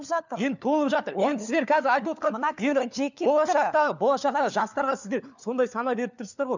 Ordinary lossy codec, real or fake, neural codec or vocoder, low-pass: none; fake; codec, 16 kHz, 4 kbps, FunCodec, trained on LibriTTS, 50 frames a second; 7.2 kHz